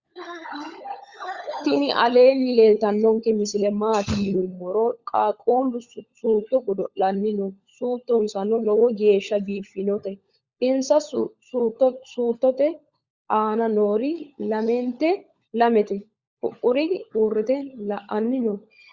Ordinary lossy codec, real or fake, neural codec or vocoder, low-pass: Opus, 64 kbps; fake; codec, 16 kHz, 16 kbps, FunCodec, trained on LibriTTS, 50 frames a second; 7.2 kHz